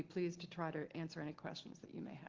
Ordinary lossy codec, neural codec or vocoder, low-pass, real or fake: Opus, 16 kbps; none; 7.2 kHz; real